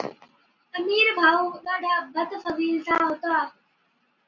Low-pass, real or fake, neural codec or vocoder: 7.2 kHz; real; none